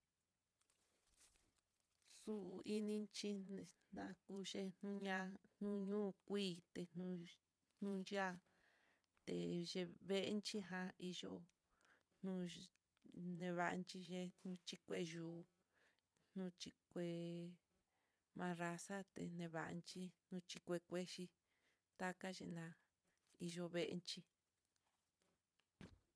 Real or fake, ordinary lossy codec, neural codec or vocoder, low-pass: fake; none; vocoder, 22.05 kHz, 80 mel bands, Vocos; none